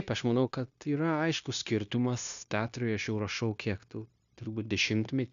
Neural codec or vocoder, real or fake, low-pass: codec, 16 kHz, 0.9 kbps, LongCat-Audio-Codec; fake; 7.2 kHz